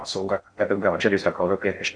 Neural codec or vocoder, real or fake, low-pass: codec, 16 kHz in and 24 kHz out, 0.6 kbps, FocalCodec, streaming, 4096 codes; fake; 9.9 kHz